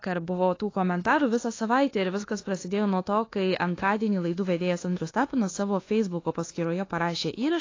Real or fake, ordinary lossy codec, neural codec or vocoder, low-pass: fake; AAC, 32 kbps; codec, 24 kHz, 1.2 kbps, DualCodec; 7.2 kHz